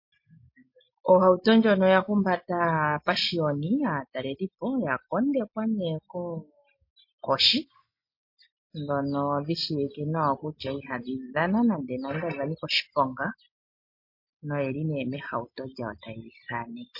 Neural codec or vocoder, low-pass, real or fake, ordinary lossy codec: none; 5.4 kHz; real; MP3, 32 kbps